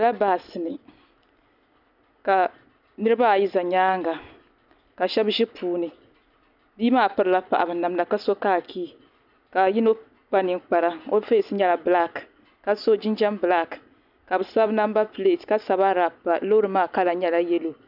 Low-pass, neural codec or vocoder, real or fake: 5.4 kHz; none; real